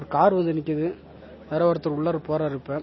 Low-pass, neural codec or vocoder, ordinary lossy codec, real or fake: 7.2 kHz; none; MP3, 24 kbps; real